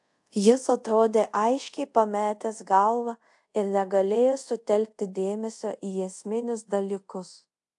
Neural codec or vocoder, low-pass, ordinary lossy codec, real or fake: codec, 24 kHz, 0.5 kbps, DualCodec; 10.8 kHz; AAC, 64 kbps; fake